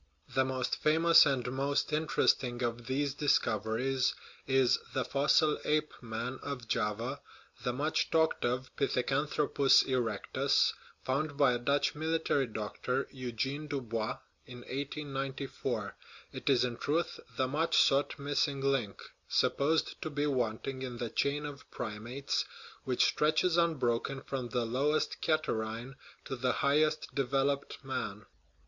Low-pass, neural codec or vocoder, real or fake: 7.2 kHz; none; real